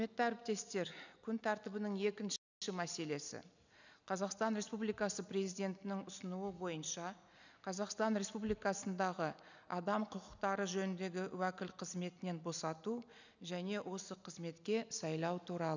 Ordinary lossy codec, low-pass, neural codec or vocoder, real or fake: none; 7.2 kHz; none; real